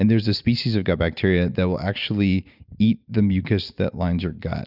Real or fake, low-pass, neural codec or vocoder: real; 5.4 kHz; none